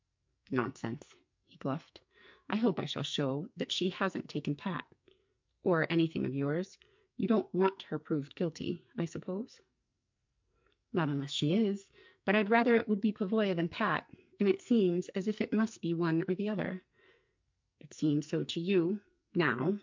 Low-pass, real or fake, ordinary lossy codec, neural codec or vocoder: 7.2 kHz; fake; MP3, 64 kbps; codec, 44.1 kHz, 2.6 kbps, SNAC